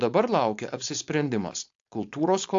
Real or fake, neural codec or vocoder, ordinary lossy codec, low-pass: fake; codec, 16 kHz, 4.8 kbps, FACodec; AAC, 64 kbps; 7.2 kHz